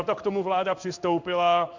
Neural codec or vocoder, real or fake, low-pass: codec, 16 kHz in and 24 kHz out, 1 kbps, XY-Tokenizer; fake; 7.2 kHz